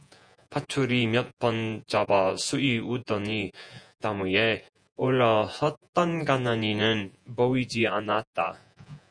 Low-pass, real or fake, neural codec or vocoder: 9.9 kHz; fake; vocoder, 48 kHz, 128 mel bands, Vocos